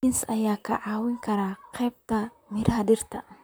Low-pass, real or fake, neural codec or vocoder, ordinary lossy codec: none; fake; vocoder, 44.1 kHz, 128 mel bands, Pupu-Vocoder; none